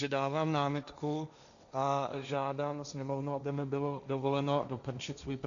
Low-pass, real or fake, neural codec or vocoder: 7.2 kHz; fake; codec, 16 kHz, 1.1 kbps, Voila-Tokenizer